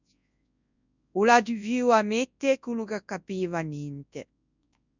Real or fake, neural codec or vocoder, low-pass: fake; codec, 24 kHz, 0.9 kbps, WavTokenizer, large speech release; 7.2 kHz